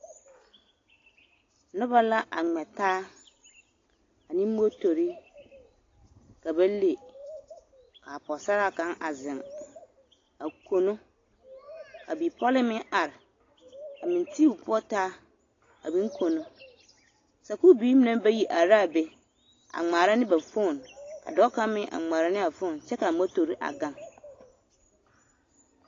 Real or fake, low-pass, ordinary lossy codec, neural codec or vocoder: real; 7.2 kHz; AAC, 32 kbps; none